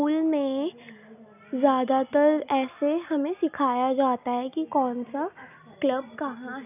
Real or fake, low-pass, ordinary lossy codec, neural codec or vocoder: real; 3.6 kHz; none; none